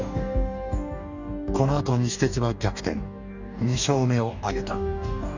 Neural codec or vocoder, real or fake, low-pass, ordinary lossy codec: codec, 44.1 kHz, 2.6 kbps, DAC; fake; 7.2 kHz; none